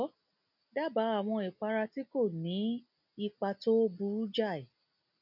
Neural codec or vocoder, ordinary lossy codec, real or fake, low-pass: none; none; real; 5.4 kHz